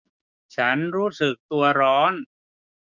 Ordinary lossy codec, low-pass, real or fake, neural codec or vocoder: none; 7.2 kHz; fake; codec, 16 kHz, 6 kbps, DAC